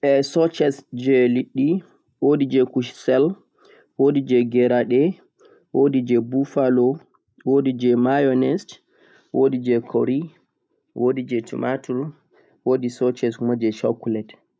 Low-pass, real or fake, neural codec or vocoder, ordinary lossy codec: none; real; none; none